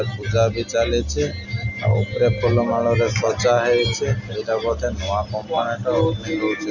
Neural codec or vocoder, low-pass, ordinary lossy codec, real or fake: none; 7.2 kHz; none; real